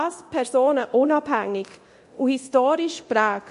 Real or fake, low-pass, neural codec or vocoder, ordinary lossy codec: fake; 10.8 kHz; codec, 24 kHz, 0.9 kbps, DualCodec; MP3, 48 kbps